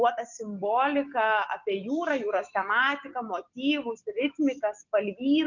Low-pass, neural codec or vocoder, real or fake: 7.2 kHz; none; real